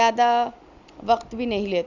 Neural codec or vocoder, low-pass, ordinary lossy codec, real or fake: none; 7.2 kHz; none; real